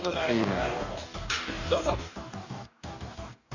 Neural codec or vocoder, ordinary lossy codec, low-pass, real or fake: codec, 44.1 kHz, 2.6 kbps, DAC; none; 7.2 kHz; fake